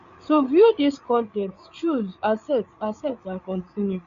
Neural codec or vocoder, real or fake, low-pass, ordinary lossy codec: codec, 16 kHz, 8 kbps, FreqCodec, larger model; fake; 7.2 kHz; MP3, 96 kbps